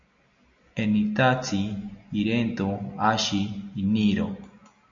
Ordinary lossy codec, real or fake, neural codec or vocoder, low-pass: MP3, 64 kbps; real; none; 7.2 kHz